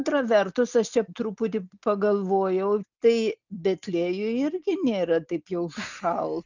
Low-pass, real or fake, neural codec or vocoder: 7.2 kHz; real; none